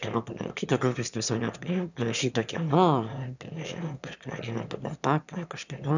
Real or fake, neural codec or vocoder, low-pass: fake; autoencoder, 22.05 kHz, a latent of 192 numbers a frame, VITS, trained on one speaker; 7.2 kHz